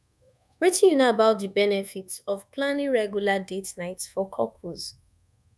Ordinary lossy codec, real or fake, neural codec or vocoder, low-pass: none; fake; codec, 24 kHz, 1.2 kbps, DualCodec; none